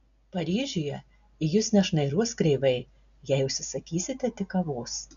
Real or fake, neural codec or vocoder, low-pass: real; none; 7.2 kHz